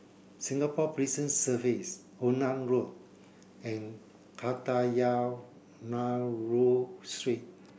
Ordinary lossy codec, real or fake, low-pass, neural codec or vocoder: none; real; none; none